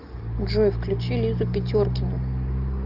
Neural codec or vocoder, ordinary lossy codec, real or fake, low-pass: none; Opus, 24 kbps; real; 5.4 kHz